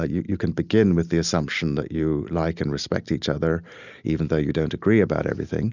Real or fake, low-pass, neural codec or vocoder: real; 7.2 kHz; none